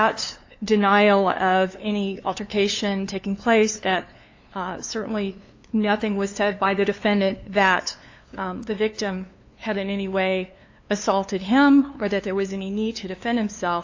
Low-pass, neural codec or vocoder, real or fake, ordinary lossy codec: 7.2 kHz; codec, 16 kHz, 2 kbps, FunCodec, trained on LibriTTS, 25 frames a second; fake; AAC, 48 kbps